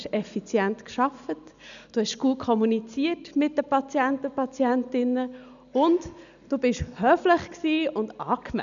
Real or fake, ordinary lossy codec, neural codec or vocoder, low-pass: real; none; none; 7.2 kHz